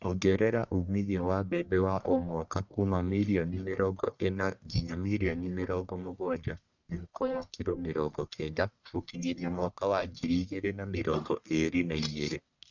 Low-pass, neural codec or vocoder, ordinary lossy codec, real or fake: 7.2 kHz; codec, 44.1 kHz, 1.7 kbps, Pupu-Codec; none; fake